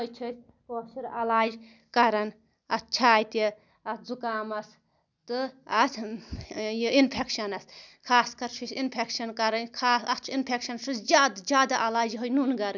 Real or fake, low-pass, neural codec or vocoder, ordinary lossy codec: real; 7.2 kHz; none; none